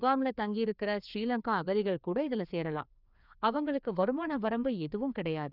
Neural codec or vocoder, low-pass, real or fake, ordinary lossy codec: codec, 16 kHz, 2 kbps, FreqCodec, larger model; 5.4 kHz; fake; none